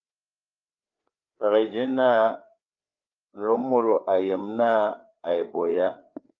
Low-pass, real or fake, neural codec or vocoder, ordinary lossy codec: 7.2 kHz; fake; codec, 16 kHz, 4 kbps, FreqCodec, larger model; Opus, 24 kbps